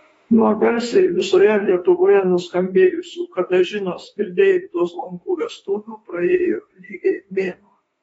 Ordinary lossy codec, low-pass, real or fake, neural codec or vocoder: AAC, 24 kbps; 19.8 kHz; fake; autoencoder, 48 kHz, 32 numbers a frame, DAC-VAE, trained on Japanese speech